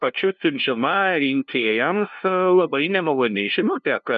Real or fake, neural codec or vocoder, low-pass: fake; codec, 16 kHz, 0.5 kbps, FunCodec, trained on LibriTTS, 25 frames a second; 7.2 kHz